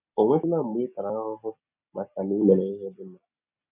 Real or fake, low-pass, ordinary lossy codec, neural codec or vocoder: real; 3.6 kHz; none; none